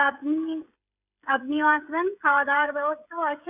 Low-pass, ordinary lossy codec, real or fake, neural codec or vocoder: 3.6 kHz; none; fake; codec, 16 kHz, 16 kbps, FreqCodec, smaller model